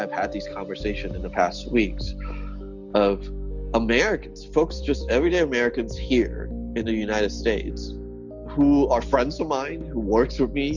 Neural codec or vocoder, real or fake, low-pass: none; real; 7.2 kHz